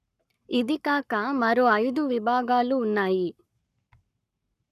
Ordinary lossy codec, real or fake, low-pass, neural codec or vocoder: none; fake; 14.4 kHz; codec, 44.1 kHz, 7.8 kbps, Pupu-Codec